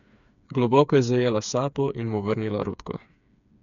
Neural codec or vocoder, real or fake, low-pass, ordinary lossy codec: codec, 16 kHz, 4 kbps, FreqCodec, smaller model; fake; 7.2 kHz; none